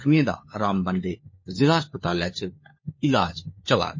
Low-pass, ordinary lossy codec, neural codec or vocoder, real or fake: 7.2 kHz; MP3, 32 kbps; codec, 16 kHz, 4 kbps, FunCodec, trained on LibriTTS, 50 frames a second; fake